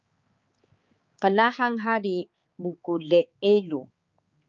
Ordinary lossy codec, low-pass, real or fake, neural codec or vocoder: Opus, 24 kbps; 7.2 kHz; fake; codec, 16 kHz, 4 kbps, X-Codec, HuBERT features, trained on balanced general audio